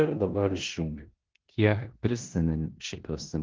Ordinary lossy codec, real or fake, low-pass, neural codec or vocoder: Opus, 16 kbps; fake; 7.2 kHz; codec, 16 kHz in and 24 kHz out, 0.9 kbps, LongCat-Audio-Codec, four codebook decoder